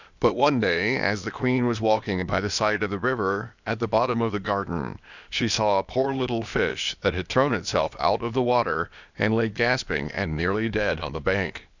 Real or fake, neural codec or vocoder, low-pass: fake; codec, 16 kHz, 0.8 kbps, ZipCodec; 7.2 kHz